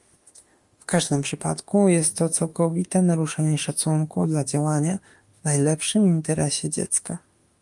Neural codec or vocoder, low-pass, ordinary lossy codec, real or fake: autoencoder, 48 kHz, 32 numbers a frame, DAC-VAE, trained on Japanese speech; 10.8 kHz; Opus, 32 kbps; fake